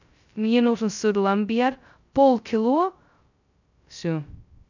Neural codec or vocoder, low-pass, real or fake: codec, 16 kHz, 0.2 kbps, FocalCodec; 7.2 kHz; fake